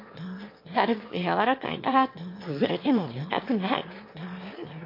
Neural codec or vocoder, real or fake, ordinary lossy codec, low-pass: autoencoder, 22.05 kHz, a latent of 192 numbers a frame, VITS, trained on one speaker; fake; MP3, 32 kbps; 5.4 kHz